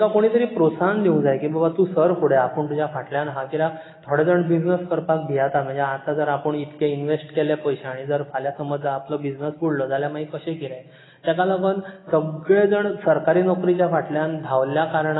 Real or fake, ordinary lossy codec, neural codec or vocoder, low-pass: real; AAC, 16 kbps; none; 7.2 kHz